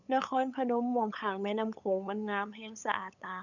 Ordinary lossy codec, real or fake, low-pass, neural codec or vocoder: none; fake; 7.2 kHz; codec, 16 kHz, 8 kbps, FunCodec, trained on LibriTTS, 25 frames a second